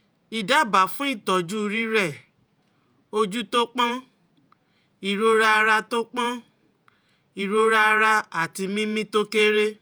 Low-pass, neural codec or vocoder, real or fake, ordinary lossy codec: none; vocoder, 48 kHz, 128 mel bands, Vocos; fake; none